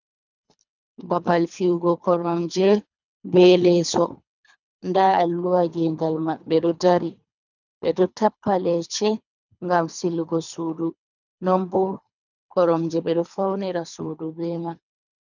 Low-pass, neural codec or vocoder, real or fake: 7.2 kHz; codec, 24 kHz, 3 kbps, HILCodec; fake